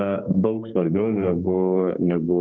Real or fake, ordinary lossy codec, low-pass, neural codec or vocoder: fake; AAC, 48 kbps; 7.2 kHz; codec, 32 kHz, 1.9 kbps, SNAC